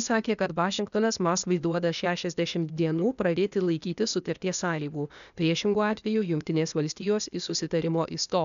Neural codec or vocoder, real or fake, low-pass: codec, 16 kHz, 0.8 kbps, ZipCodec; fake; 7.2 kHz